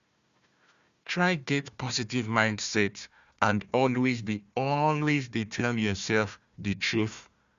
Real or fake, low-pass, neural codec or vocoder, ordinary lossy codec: fake; 7.2 kHz; codec, 16 kHz, 1 kbps, FunCodec, trained on Chinese and English, 50 frames a second; Opus, 64 kbps